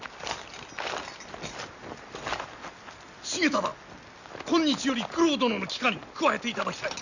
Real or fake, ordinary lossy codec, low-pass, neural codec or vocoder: real; none; 7.2 kHz; none